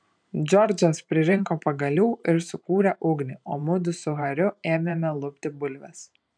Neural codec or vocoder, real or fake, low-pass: vocoder, 44.1 kHz, 128 mel bands every 512 samples, BigVGAN v2; fake; 9.9 kHz